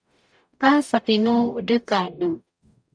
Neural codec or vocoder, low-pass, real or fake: codec, 44.1 kHz, 0.9 kbps, DAC; 9.9 kHz; fake